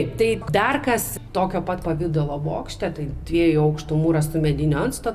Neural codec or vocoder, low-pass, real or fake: none; 14.4 kHz; real